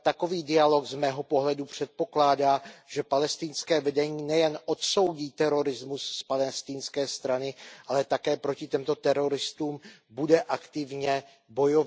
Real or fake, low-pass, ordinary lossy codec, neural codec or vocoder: real; none; none; none